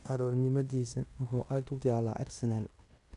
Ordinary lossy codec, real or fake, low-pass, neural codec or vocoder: none; fake; 10.8 kHz; codec, 16 kHz in and 24 kHz out, 0.9 kbps, LongCat-Audio-Codec, fine tuned four codebook decoder